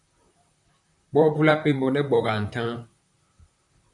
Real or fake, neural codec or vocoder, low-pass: fake; vocoder, 44.1 kHz, 128 mel bands, Pupu-Vocoder; 10.8 kHz